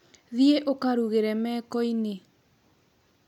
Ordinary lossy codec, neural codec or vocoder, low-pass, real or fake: none; none; 19.8 kHz; real